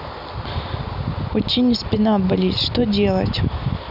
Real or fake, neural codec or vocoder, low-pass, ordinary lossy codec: real; none; 5.4 kHz; none